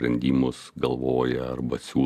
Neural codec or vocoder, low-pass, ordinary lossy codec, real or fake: none; 14.4 kHz; AAC, 96 kbps; real